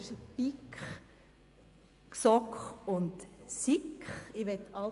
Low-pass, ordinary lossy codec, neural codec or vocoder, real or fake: 10.8 kHz; none; vocoder, 24 kHz, 100 mel bands, Vocos; fake